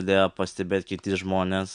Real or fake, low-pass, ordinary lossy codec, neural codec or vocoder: real; 9.9 kHz; MP3, 96 kbps; none